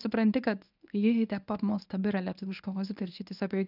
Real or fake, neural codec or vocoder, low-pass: fake; codec, 24 kHz, 0.9 kbps, WavTokenizer, medium speech release version 1; 5.4 kHz